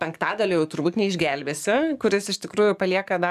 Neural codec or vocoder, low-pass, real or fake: codec, 44.1 kHz, 7.8 kbps, DAC; 14.4 kHz; fake